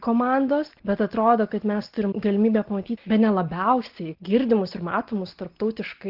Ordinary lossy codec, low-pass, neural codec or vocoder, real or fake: Opus, 16 kbps; 5.4 kHz; none; real